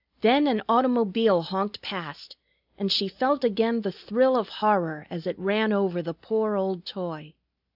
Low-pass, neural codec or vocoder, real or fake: 5.4 kHz; none; real